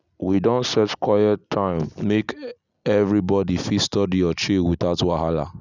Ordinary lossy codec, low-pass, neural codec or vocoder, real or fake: none; 7.2 kHz; none; real